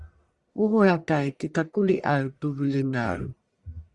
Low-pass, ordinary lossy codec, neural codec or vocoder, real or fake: 10.8 kHz; Opus, 64 kbps; codec, 44.1 kHz, 1.7 kbps, Pupu-Codec; fake